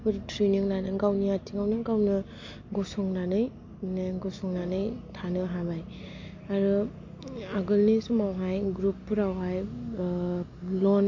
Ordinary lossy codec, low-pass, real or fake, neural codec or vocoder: MP3, 48 kbps; 7.2 kHz; real; none